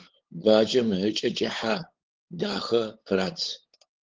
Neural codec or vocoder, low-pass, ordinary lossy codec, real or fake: codec, 16 kHz, 8 kbps, FunCodec, trained on LibriTTS, 25 frames a second; 7.2 kHz; Opus, 16 kbps; fake